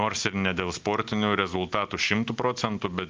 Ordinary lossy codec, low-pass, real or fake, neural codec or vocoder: Opus, 24 kbps; 7.2 kHz; real; none